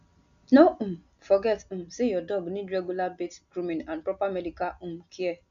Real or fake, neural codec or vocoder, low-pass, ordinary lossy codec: real; none; 7.2 kHz; none